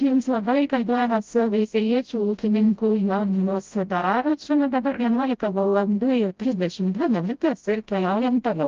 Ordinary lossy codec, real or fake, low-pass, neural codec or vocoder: Opus, 32 kbps; fake; 7.2 kHz; codec, 16 kHz, 0.5 kbps, FreqCodec, smaller model